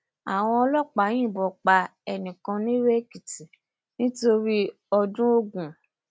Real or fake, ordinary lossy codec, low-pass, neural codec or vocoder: real; none; none; none